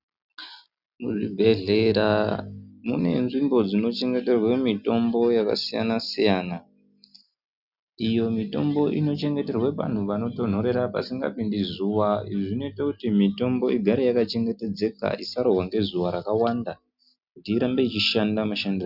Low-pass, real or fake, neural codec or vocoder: 5.4 kHz; real; none